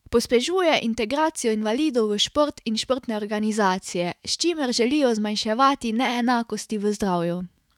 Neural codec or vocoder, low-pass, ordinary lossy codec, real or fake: autoencoder, 48 kHz, 128 numbers a frame, DAC-VAE, trained on Japanese speech; 19.8 kHz; none; fake